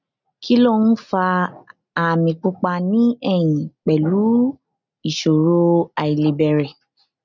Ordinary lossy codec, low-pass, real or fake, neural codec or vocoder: none; 7.2 kHz; real; none